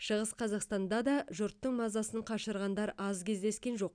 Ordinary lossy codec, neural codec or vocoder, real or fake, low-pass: none; none; real; 9.9 kHz